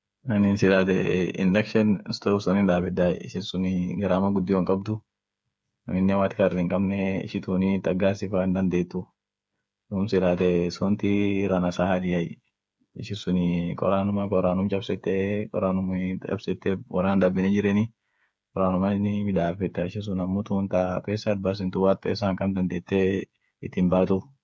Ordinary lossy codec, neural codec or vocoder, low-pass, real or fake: none; codec, 16 kHz, 8 kbps, FreqCodec, smaller model; none; fake